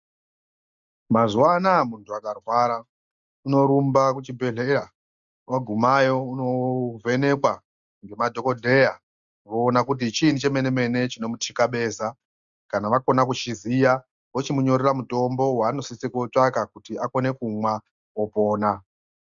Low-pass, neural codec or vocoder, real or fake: 7.2 kHz; none; real